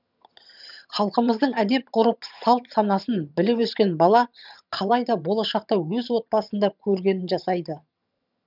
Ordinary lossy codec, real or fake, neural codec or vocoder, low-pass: none; fake; vocoder, 22.05 kHz, 80 mel bands, HiFi-GAN; 5.4 kHz